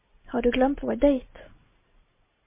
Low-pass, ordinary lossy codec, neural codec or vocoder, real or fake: 3.6 kHz; MP3, 24 kbps; none; real